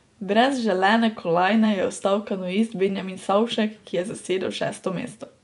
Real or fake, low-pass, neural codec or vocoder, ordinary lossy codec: fake; 10.8 kHz; vocoder, 24 kHz, 100 mel bands, Vocos; none